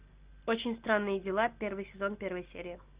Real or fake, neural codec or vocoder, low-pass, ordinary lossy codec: real; none; 3.6 kHz; Opus, 64 kbps